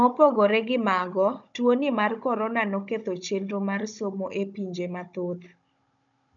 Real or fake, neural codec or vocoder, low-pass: fake; codec, 16 kHz, 16 kbps, FunCodec, trained on Chinese and English, 50 frames a second; 7.2 kHz